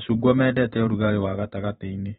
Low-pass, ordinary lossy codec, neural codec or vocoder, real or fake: 19.8 kHz; AAC, 16 kbps; vocoder, 44.1 kHz, 128 mel bands every 512 samples, BigVGAN v2; fake